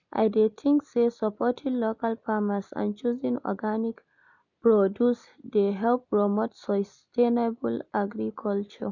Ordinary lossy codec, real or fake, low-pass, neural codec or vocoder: none; real; 7.2 kHz; none